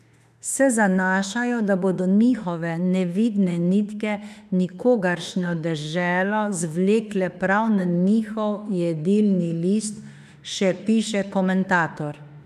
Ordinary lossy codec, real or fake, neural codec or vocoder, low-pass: none; fake; autoencoder, 48 kHz, 32 numbers a frame, DAC-VAE, trained on Japanese speech; 14.4 kHz